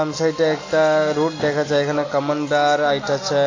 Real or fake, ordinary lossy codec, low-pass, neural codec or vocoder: real; AAC, 32 kbps; 7.2 kHz; none